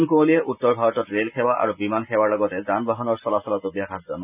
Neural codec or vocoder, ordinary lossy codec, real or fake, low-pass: none; none; real; 3.6 kHz